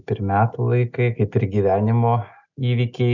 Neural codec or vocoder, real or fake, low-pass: none; real; 7.2 kHz